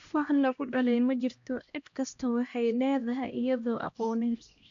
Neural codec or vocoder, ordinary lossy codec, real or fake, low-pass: codec, 16 kHz, 1 kbps, X-Codec, HuBERT features, trained on LibriSpeech; AAC, 64 kbps; fake; 7.2 kHz